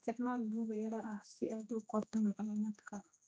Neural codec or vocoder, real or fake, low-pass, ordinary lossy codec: codec, 16 kHz, 1 kbps, X-Codec, HuBERT features, trained on general audio; fake; none; none